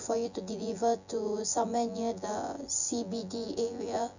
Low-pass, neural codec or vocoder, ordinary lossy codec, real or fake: 7.2 kHz; vocoder, 24 kHz, 100 mel bands, Vocos; none; fake